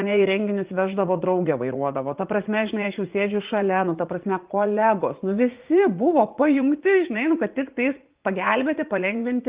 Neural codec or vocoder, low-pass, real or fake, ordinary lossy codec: vocoder, 44.1 kHz, 80 mel bands, Vocos; 3.6 kHz; fake; Opus, 32 kbps